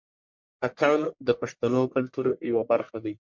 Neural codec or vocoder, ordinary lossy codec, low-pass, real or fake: codec, 44.1 kHz, 1.7 kbps, Pupu-Codec; MP3, 48 kbps; 7.2 kHz; fake